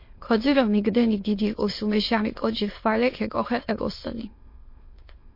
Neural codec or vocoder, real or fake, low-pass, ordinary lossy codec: autoencoder, 22.05 kHz, a latent of 192 numbers a frame, VITS, trained on many speakers; fake; 5.4 kHz; MP3, 32 kbps